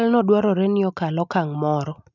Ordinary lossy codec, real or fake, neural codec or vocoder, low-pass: none; real; none; 7.2 kHz